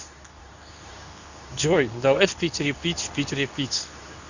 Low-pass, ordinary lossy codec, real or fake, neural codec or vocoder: 7.2 kHz; none; fake; codec, 24 kHz, 0.9 kbps, WavTokenizer, medium speech release version 2